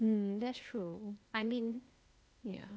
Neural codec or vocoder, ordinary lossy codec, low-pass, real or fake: codec, 16 kHz, 0.8 kbps, ZipCodec; none; none; fake